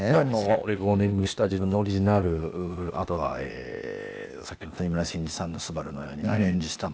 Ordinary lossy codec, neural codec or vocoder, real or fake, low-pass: none; codec, 16 kHz, 0.8 kbps, ZipCodec; fake; none